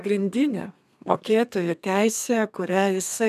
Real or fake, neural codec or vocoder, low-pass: fake; codec, 32 kHz, 1.9 kbps, SNAC; 14.4 kHz